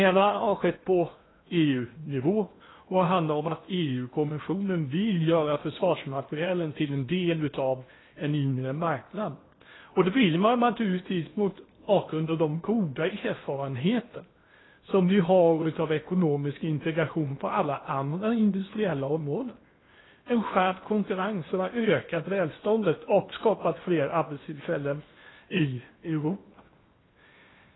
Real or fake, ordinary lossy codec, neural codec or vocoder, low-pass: fake; AAC, 16 kbps; codec, 16 kHz in and 24 kHz out, 0.8 kbps, FocalCodec, streaming, 65536 codes; 7.2 kHz